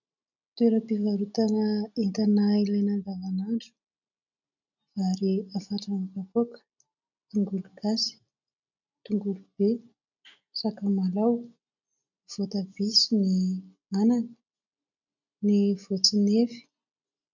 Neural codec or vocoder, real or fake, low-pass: none; real; 7.2 kHz